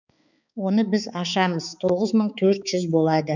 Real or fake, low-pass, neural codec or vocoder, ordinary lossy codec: fake; 7.2 kHz; codec, 16 kHz, 4 kbps, X-Codec, HuBERT features, trained on balanced general audio; none